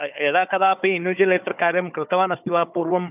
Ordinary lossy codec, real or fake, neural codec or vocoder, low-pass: none; fake; codec, 16 kHz, 4 kbps, FunCodec, trained on Chinese and English, 50 frames a second; 3.6 kHz